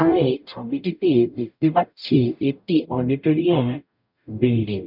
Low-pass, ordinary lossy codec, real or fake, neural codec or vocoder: 5.4 kHz; none; fake; codec, 44.1 kHz, 0.9 kbps, DAC